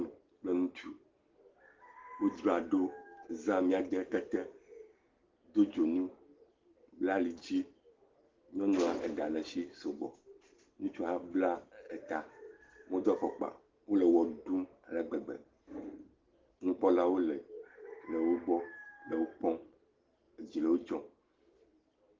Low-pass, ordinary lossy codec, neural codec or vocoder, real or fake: 7.2 kHz; Opus, 16 kbps; none; real